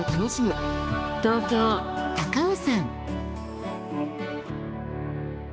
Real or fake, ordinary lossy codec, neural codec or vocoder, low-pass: fake; none; codec, 16 kHz, 2 kbps, X-Codec, HuBERT features, trained on balanced general audio; none